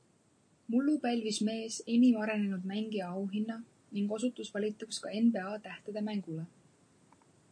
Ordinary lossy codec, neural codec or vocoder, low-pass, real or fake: MP3, 48 kbps; none; 9.9 kHz; real